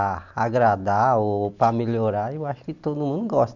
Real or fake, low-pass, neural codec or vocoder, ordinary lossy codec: real; 7.2 kHz; none; none